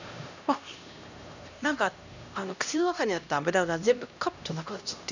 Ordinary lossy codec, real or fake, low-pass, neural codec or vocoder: none; fake; 7.2 kHz; codec, 16 kHz, 1 kbps, X-Codec, HuBERT features, trained on LibriSpeech